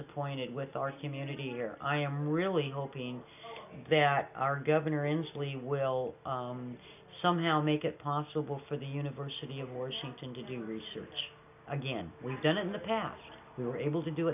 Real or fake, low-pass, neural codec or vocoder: real; 3.6 kHz; none